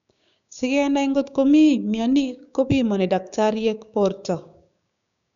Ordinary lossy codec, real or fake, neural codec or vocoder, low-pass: none; fake; codec, 16 kHz, 6 kbps, DAC; 7.2 kHz